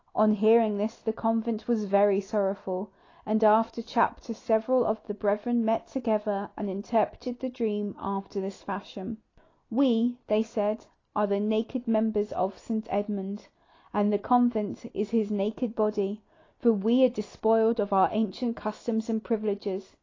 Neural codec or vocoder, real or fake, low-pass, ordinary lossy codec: none; real; 7.2 kHz; AAC, 32 kbps